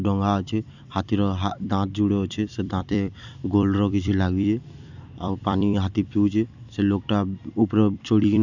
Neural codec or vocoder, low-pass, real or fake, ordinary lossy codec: vocoder, 44.1 kHz, 128 mel bands every 256 samples, BigVGAN v2; 7.2 kHz; fake; none